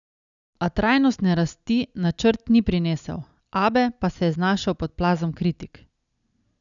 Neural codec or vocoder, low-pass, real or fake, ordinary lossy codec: none; 7.2 kHz; real; none